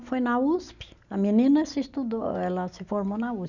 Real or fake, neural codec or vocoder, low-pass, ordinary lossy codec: real; none; 7.2 kHz; none